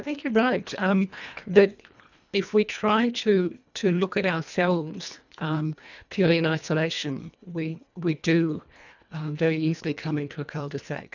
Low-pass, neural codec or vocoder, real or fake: 7.2 kHz; codec, 24 kHz, 1.5 kbps, HILCodec; fake